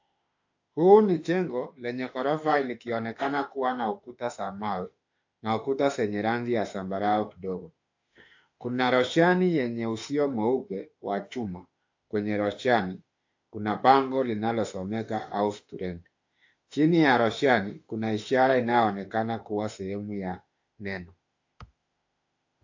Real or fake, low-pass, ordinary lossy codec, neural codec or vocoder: fake; 7.2 kHz; AAC, 48 kbps; autoencoder, 48 kHz, 32 numbers a frame, DAC-VAE, trained on Japanese speech